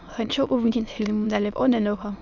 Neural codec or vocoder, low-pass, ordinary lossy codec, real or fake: autoencoder, 22.05 kHz, a latent of 192 numbers a frame, VITS, trained on many speakers; 7.2 kHz; Opus, 64 kbps; fake